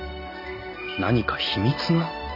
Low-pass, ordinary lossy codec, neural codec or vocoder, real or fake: 5.4 kHz; none; none; real